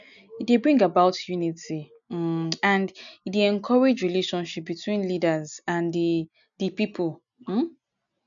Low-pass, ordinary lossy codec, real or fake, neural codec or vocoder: 7.2 kHz; none; real; none